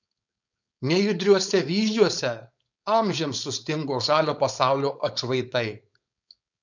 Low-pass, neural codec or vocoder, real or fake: 7.2 kHz; codec, 16 kHz, 4.8 kbps, FACodec; fake